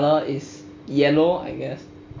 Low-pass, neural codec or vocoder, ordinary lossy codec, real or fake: 7.2 kHz; none; MP3, 64 kbps; real